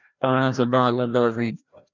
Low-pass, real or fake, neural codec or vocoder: 7.2 kHz; fake; codec, 16 kHz, 1 kbps, FreqCodec, larger model